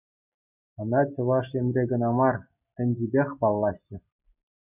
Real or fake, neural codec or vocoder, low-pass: real; none; 3.6 kHz